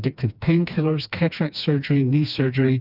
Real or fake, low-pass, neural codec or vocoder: fake; 5.4 kHz; codec, 16 kHz, 2 kbps, FreqCodec, smaller model